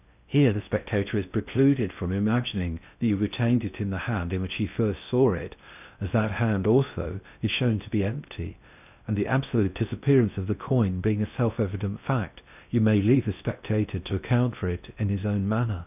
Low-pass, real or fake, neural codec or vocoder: 3.6 kHz; fake; codec, 16 kHz in and 24 kHz out, 0.6 kbps, FocalCodec, streaming, 4096 codes